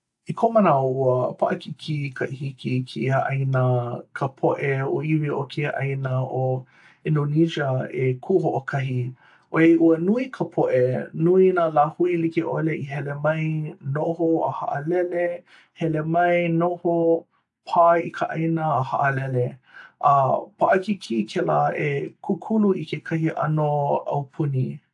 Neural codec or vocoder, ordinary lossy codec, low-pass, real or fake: none; AAC, 64 kbps; 10.8 kHz; real